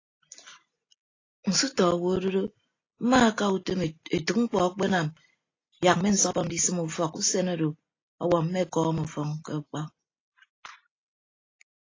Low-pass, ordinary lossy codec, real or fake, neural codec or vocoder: 7.2 kHz; AAC, 32 kbps; real; none